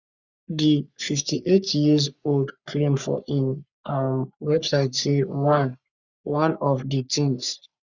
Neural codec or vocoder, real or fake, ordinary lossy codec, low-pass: codec, 44.1 kHz, 3.4 kbps, Pupu-Codec; fake; Opus, 64 kbps; 7.2 kHz